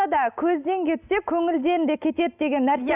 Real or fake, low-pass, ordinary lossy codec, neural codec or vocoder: real; 3.6 kHz; none; none